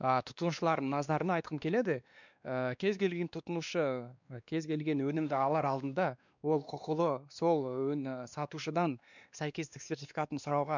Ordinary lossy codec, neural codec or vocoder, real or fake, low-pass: none; codec, 16 kHz, 2 kbps, X-Codec, WavLM features, trained on Multilingual LibriSpeech; fake; 7.2 kHz